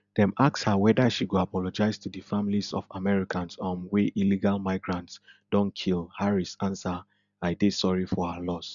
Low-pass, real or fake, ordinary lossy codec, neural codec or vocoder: 7.2 kHz; real; none; none